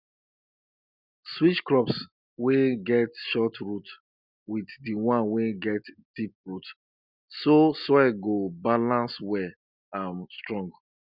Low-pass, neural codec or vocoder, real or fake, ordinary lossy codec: 5.4 kHz; none; real; none